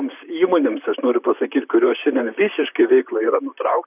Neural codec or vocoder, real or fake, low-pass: vocoder, 44.1 kHz, 128 mel bands, Pupu-Vocoder; fake; 3.6 kHz